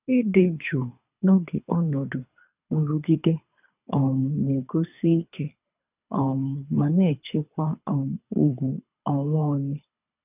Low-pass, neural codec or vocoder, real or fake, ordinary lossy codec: 3.6 kHz; codec, 24 kHz, 3 kbps, HILCodec; fake; none